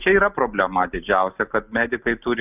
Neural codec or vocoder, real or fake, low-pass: none; real; 3.6 kHz